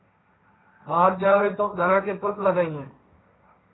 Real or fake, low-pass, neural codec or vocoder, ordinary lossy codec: fake; 7.2 kHz; codec, 16 kHz, 1.1 kbps, Voila-Tokenizer; AAC, 16 kbps